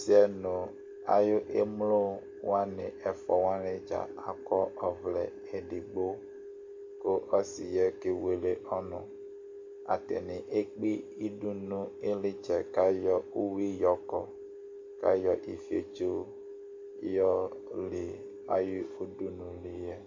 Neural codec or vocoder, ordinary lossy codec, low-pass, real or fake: none; MP3, 48 kbps; 7.2 kHz; real